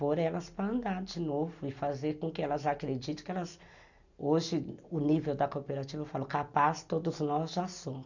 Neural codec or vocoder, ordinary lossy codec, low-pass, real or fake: none; none; 7.2 kHz; real